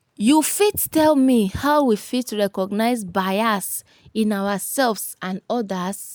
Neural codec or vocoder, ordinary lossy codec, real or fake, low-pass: none; none; real; none